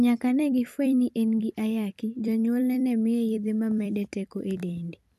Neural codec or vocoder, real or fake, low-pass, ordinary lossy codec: vocoder, 44.1 kHz, 128 mel bands every 256 samples, BigVGAN v2; fake; 14.4 kHz; none